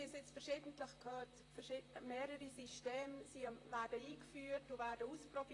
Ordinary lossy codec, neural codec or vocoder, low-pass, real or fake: AAC, 32 kbps; vocoder, 44.1 kHz, 128 mel bands, Pupu-Vocoder; 10.8 kHz; fake